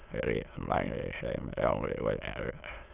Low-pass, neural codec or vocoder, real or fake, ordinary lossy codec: 3.6 kHz; autoencoder, 22.05 kHz, a latent of 192 numbers a frame, VITS, trained on many speakers; fake; Opus, 64 kbps